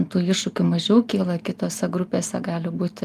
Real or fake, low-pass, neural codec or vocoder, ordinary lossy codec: real; 14.4 kHz; none; Opus, 32 kbps